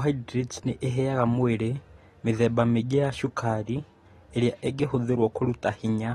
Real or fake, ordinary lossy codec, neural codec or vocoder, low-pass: real; AAC, 32 kbps; none; 19.8 kHz